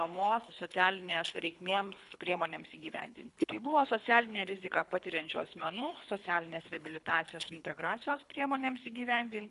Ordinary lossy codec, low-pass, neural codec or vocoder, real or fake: MP3, 96 kbps; 10.8 kHz; codec, 24 kHz, 3 kbps, HILCodec; fake